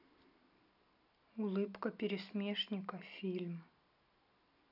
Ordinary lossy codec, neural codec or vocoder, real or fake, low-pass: none; none; real; 5.4 kHz